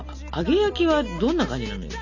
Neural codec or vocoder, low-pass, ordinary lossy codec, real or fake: none; 7.2 kHz; none; real